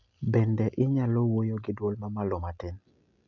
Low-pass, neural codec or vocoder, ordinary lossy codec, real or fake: 7.2 kHz; none; none; real